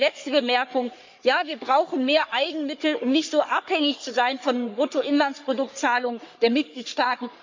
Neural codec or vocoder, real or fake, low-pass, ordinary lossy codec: codec, 44.1 kHz, 3.4 kbps, Pupu-Codec; fake; 7.2 kHz; MP3, 64 kbps